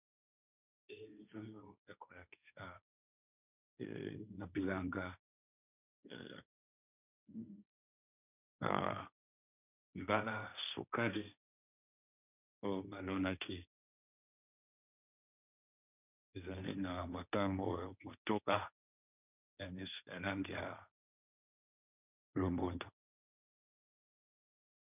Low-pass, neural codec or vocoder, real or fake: 3.6 kHz; codec, 16 kHz, 1.1 kbps, Voila-Tokenizer; fake